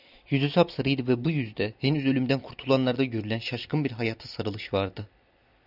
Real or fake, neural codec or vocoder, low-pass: real; none; 5.4 kHz